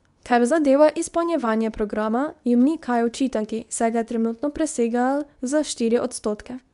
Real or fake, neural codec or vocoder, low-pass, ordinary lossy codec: fake; codec, 24 kHz, 0.9 kbps, WavTokenizer, medium speech release version 2; 10.8 kHz; none